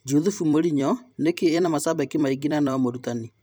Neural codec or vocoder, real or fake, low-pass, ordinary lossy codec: vocoder, 44.1 kHz, 128 mel bands every 256 samples, BigVGAN v2; fake; none; none